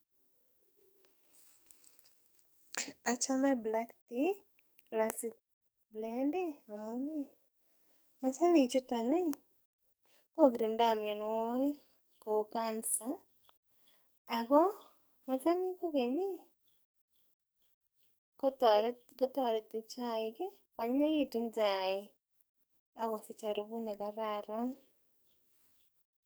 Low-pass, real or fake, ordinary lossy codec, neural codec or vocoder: none; fake; none; codec, 44.1 kHz, 2.6 kbps, SNAC